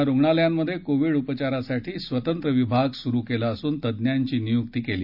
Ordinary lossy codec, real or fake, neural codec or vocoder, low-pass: none; real; none; 5.4 kHz